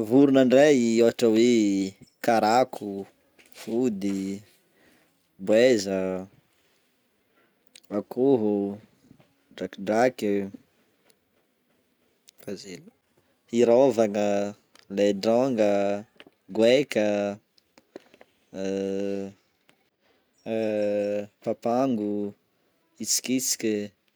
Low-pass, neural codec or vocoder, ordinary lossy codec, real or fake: none; none; none; real